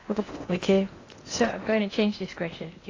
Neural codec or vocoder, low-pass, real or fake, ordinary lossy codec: codec, 16 kHz in and 24 kHz out, 0.8 kbps, FocalCodec, streaming, 65536 codes; 7.2 kHz; fake; AAC, 32 kbps